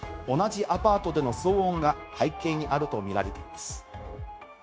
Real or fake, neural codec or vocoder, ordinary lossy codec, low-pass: fake; codec, 16 kHz, 0.9 kbps, LongCat-Audio-Codec; none; none